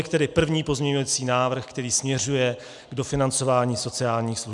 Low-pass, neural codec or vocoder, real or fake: 10.8 kHz; none; real